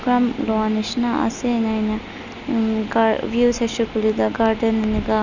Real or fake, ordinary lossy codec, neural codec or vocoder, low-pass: real; none; none; 7.2 kHz